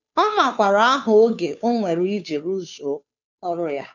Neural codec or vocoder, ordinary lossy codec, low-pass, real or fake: codec, 16 kHz, 2 kbps, FunCodec, trained on Chinese and English, 25 frames a second; none; 7.2 kHz; fake